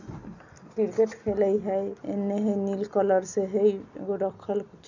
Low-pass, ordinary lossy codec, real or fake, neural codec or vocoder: 7.2 kHz; none; real; none